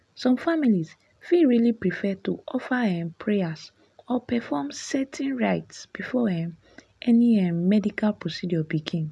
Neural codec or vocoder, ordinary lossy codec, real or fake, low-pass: none; none; real; none